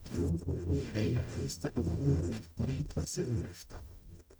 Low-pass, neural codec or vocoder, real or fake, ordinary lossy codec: none; codec, 44.1 kHz, 0.9 kbps, DAC; fake; none